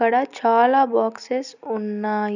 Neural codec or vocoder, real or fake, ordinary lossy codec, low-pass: none; real; none; 7.2 kHz